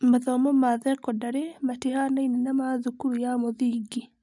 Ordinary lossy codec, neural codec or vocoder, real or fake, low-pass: none; none; real; 10.8 kHz